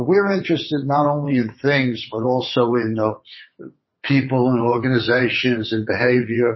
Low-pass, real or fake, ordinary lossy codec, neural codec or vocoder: 7.2 kHz; fake; MP3, 24 kbps; vocoder, 22.05 kHz, 80 mel bands, WaveNeXt